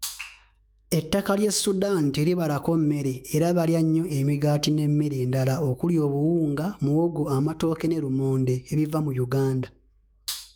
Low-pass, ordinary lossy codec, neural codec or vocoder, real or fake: none; none; autoencoder, 48 kHz, 128 numbers a frame, DAC-VAE, trained on Japanese speech; fake